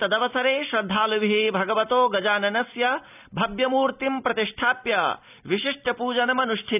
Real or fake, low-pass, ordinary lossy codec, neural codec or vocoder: real; 3.6 kHz; none; none